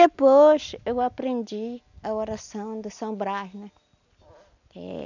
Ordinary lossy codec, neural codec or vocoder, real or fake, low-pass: none; none; real; 7.2 kHz